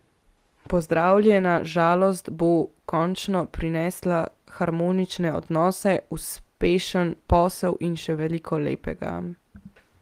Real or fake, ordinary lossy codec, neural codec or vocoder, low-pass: real; Opus, 24 kbps; none; 14.4 kHz